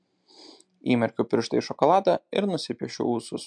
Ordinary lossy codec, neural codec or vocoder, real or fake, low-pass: MP3, 64 kbps; none; real; 9.9 kHz